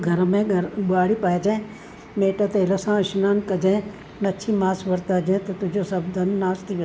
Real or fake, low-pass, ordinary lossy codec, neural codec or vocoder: real; none; none; none